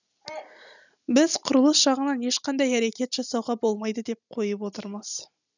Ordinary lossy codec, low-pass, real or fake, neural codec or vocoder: none; 7.2 kHz; real; none